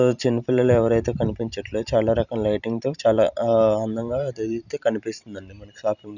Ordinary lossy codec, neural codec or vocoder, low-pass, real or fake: none; vocoder, 44.1 kHz, 128 mel bands every 512 samples, BigVGAN v2; 7.2 kHz; fake